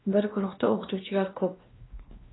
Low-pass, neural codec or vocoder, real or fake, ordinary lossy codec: 7.2 kHz; codec, 16 kHz, 1 kbps, X-Codec, WavLM features, trained on Multilingual LibriSpeech; fake; AAC, 16 kbps